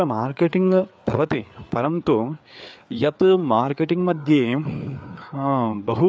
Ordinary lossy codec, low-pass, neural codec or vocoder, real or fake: none; none; codec, 16 kHz, 4 kbps, FreqCodec, larger model; fake